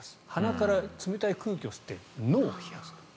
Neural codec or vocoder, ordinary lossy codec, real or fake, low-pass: none; none; real; none